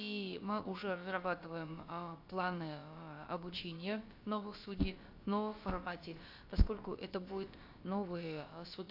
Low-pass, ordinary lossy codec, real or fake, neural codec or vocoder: 5.4 kHz; AAC, 48 kbps; fake; codec, 16 kHz, about 1 kbps, DyCAST, with the encoder's durations